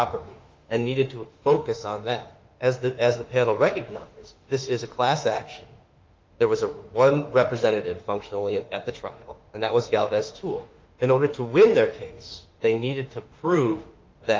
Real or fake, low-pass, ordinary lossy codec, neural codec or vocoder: fake; 7.2 kHz; Opus, 32 kbps; autoencoder, 48 kHz, 32 numbers a frame, DAC-VAE, trained on Japanese speech